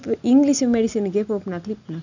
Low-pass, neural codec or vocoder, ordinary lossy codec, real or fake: 7.2 kHz; none; none; real